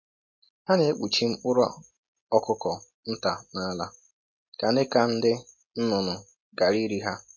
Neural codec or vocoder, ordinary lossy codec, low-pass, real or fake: none; MP3, 32 kbps; 7.2 kHz; real